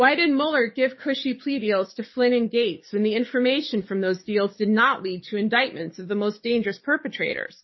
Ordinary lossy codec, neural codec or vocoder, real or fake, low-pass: MP3, 24 kbps; codec, 44.1 kHz, 7.8 kbps, DAC; fake; 7.2 kHz